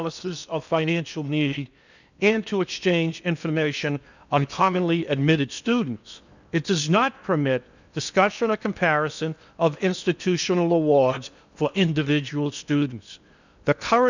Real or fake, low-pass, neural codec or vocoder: fake; 7.2 kHz; codec, 16 kHz in and 24 kHz out, 0.8 kbps, FocalCodec, streaming, 65536 codes